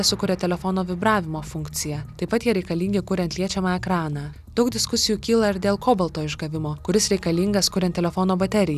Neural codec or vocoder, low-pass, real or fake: none; 14.4 kHz; real